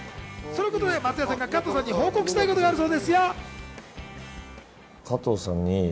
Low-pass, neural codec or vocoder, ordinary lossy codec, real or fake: none; none; none; real